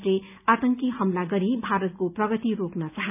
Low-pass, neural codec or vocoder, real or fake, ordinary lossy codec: 3.6 kHz; none; real; none